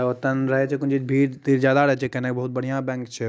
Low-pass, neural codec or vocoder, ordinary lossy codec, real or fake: none; none; none; real